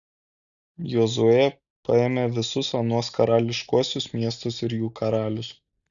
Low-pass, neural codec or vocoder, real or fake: 7.2 kHz; none; real